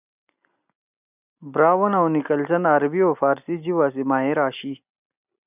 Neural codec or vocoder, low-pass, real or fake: none; 3.6 kHz; real